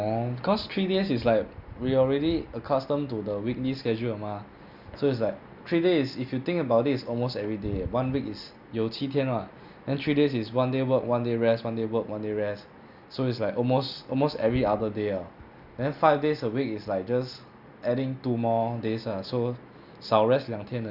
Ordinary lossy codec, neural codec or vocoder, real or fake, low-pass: Opus, 64 kbps; none; real; 5.4 kHz